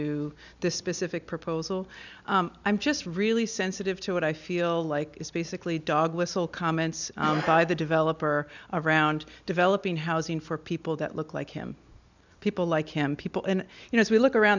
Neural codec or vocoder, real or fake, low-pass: none; real; 7.2 kHz